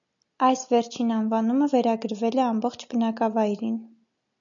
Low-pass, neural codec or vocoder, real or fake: 7.2 kHz; none; real